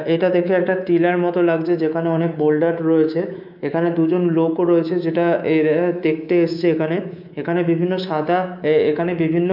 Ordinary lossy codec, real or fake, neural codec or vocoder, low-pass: none; fake; codec, 24 kHz, 3.1 kbps, DualCodec; 5.4 kHz